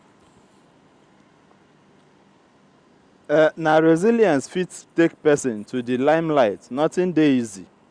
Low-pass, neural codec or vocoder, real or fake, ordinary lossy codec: 9.9 kHz; none; real; Opus, 64 kbps